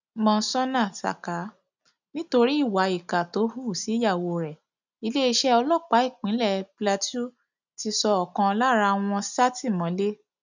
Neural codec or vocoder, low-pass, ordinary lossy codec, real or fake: none; 7.2 kHz; none; real